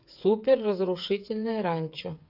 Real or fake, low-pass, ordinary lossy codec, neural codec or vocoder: fake; 5.4 kHz; AAC, 48 kbps; codec, 16 kHz, 8 kbps, FreqCodec, smaller model